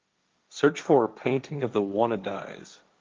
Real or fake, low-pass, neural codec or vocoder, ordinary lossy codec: fake; 7.2 kHz; codec, 16 kHz, 1.1 kbps, Voila-Tokenizer; Opus, 24 kbps